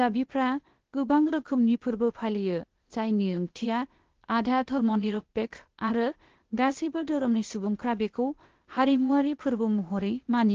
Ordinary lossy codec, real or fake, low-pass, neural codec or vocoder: Opus, 16 kbps; fake; 7.2 kHz; codec, 16 kHz, 0.8 kbps, ZipCodec